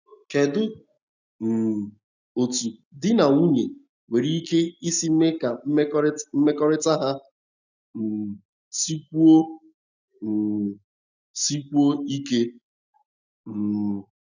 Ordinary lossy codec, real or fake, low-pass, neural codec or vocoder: none; real; 7.2 kHz; none